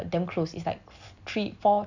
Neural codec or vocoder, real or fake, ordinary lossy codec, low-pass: none; real; none; 7.2 kHz